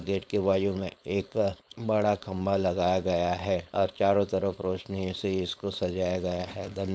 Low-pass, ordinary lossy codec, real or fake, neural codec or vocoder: none; none; fake; codec, 16 kHz, 4.8 kbps, FACodec